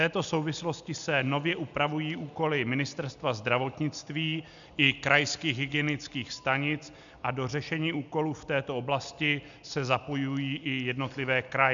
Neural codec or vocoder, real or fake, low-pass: none; real; 7.2 kHz